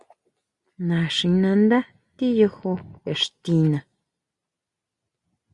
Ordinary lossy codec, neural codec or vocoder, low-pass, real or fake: Opus, 64 kbps; none; 10.8 kHz; real